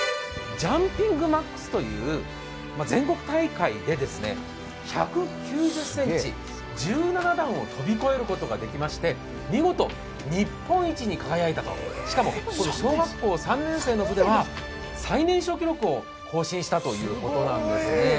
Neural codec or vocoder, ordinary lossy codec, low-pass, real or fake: none; none; none; real